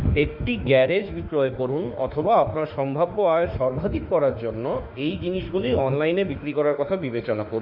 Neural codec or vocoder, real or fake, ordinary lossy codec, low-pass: autoencoder, 48 kHz, 32 numbers a frame, DAC-VAE, trained on Japanese speech; fake; none; 5.4 kHz